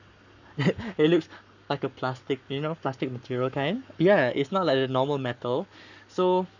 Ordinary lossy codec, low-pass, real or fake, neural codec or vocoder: none; 7.2 kHz; fake; codec, 44.1 kHz, 7.8 kbps, Pupu-Codec